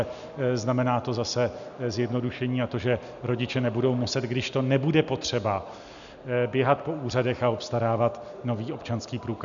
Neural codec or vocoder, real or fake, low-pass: none; real; 7.2 kHz